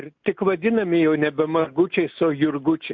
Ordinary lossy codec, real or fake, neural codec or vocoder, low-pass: MP3, 48 kbps; real; none; 7.2 kHz